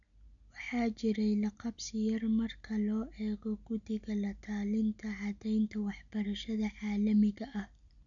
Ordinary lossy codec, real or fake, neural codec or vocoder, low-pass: none; real; none; 7.2 kHz